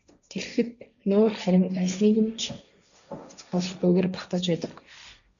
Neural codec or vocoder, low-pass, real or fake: codec, 16 kHz, 1.1 kbps, Voila-Tokenizer; 7.2 kHz; fake